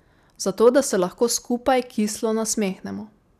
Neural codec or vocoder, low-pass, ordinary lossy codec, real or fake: none; 14.4 kHz; none; real